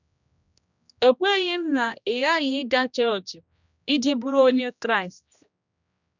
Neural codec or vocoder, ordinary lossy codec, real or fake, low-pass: codec, 16 kHz, 1 kbps, X-Codec, HuBERT features, trained on general audio; none; fake; 7.2 kHz